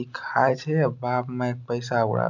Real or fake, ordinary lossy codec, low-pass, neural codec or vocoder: real; none; 7.2 kHz; none